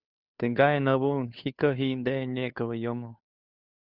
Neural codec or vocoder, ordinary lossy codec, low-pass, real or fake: codec, 16 kHz, 2 kbps, FunCodec, trained on Chinese and English, 25 frames a second; Opus, 64 kbps; 5.4 kHz; fake